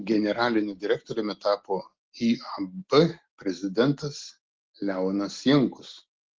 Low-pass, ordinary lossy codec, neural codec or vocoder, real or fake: 7.2 kHz; Opus, 16 kbps; none; real